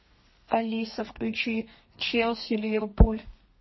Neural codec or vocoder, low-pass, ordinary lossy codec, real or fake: codec, 32 kHz, 1.9 kbps, SNAC; 7.2 kHz; MP3, 24 kbps; fake